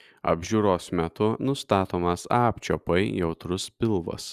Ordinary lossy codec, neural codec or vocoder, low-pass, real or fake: Opus, 64 kbps; none; 14.4 kHz; real